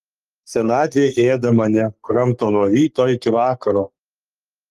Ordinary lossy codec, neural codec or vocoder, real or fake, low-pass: Opus, 32 kbps; codec, 44.1 kHz, 3.4 kbps, Pupu-Codec; fake; 14.4 kHz